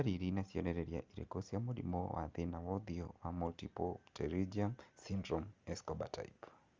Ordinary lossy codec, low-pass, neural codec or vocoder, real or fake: Opus, 32 kbps; 7.2 kHz; none; real